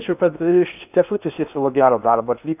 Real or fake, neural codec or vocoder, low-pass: fake; codec, 16 kHz in and 24 kHz out, 0.8 kbps, FocalCodec, streaming, 65536 codes; 3.6 kHz